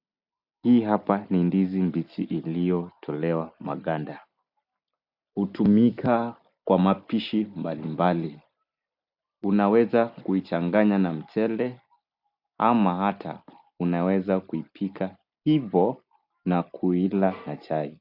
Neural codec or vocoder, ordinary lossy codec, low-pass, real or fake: none; AAC, 48 kbps; 5.4 kHz; real